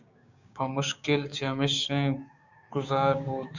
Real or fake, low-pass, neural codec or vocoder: fake; 7.2 kHz; codec, 16 kHz, 6 kbps, DAC